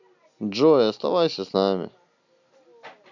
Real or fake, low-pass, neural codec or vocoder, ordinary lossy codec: real; 7.2 kHz; none; none